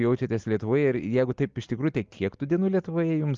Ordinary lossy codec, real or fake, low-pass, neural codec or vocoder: Opus, 32 kbps; real; 7.2 kHz; none